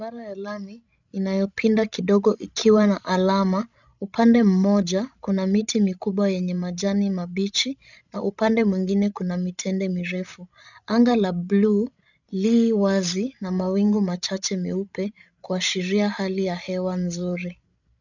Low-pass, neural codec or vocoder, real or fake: 7.2 kHz; codec, 16 kHz, 16 kbps, FreqCodec, larger model; fake